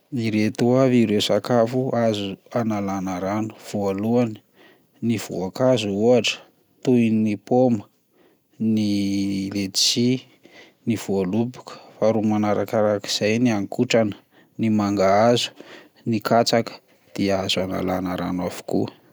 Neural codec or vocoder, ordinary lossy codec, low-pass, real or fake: none; none; none; real